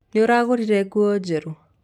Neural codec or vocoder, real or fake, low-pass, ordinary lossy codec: none; real; 19.8 kHz; none